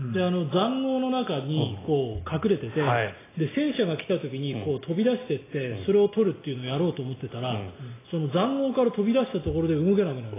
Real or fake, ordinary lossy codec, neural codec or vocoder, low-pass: real; AAC, 16 kbps; none; 3.6 kHz